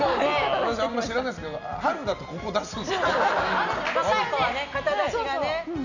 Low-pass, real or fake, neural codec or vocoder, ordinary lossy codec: 7.2 kHz; real; none; none